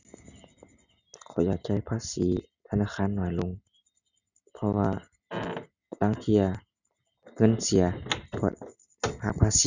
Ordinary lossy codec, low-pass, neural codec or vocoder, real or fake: none; 7.2 kHz; none; real